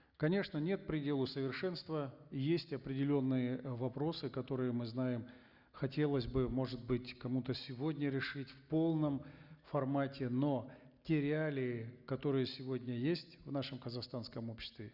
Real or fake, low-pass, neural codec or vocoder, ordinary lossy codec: real; 5.4 kHz; none; Opus, 64 kbps